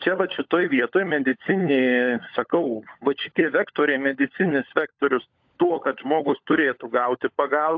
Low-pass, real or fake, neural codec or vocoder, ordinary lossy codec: 7.2 kHz; fake; codec, 16 kHz, 16 kbps, FunCodec, trained on LibriTTS, 50 frames a second; AAC, 48 kbps